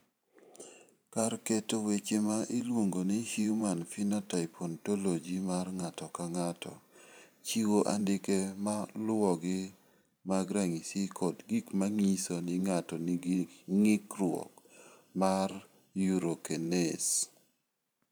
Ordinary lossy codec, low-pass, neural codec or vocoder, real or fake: none; none; vocoder, 44.1 kHz, 128 mel bands every 512 samples, BigVGAN v2; fake